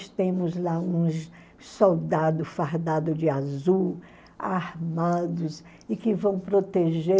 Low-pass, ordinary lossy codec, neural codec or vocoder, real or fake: none; none; none; real